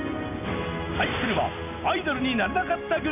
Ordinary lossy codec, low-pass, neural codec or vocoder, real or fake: none; 3.6 kHz; none; real